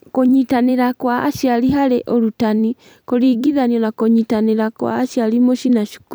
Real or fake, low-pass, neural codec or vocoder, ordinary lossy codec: fake; none; vocoder, 44.1 kHz, 128 mel bands every 256 samples, BigVGAN v2; none